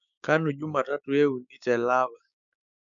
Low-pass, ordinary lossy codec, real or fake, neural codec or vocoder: 7.2 kHz; none; fake; codec, 16 kHz, 6 kbps, DAC